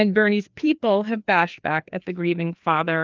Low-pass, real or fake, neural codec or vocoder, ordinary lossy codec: 7.2 kHz; fake; codec, 16 kHz, 2 kbps, FreqCodec, larger model; Opus, 24 kbps